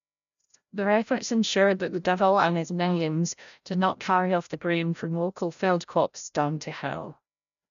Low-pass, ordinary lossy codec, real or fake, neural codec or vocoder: 7.2 kHz; none; fake; codec, 16 kHz, 0.5 kbps, FreqCodec, larger model